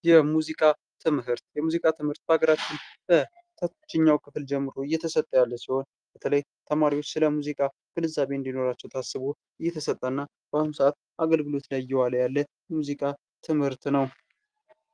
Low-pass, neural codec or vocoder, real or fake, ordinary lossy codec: 9.9 kHz; none; real; Opus, 32 kbps